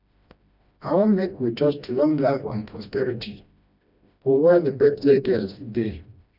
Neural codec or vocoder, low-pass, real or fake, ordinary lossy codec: codec, 16 kHz, 1 kbps, FreqCodec, smaller model; 5.4 kHz; fake; none